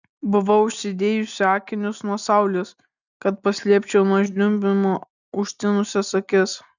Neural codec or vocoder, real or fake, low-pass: none; real; 7.2 kHz